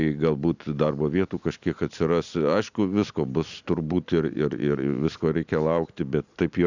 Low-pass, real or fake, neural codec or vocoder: 7.2 kHz; real; none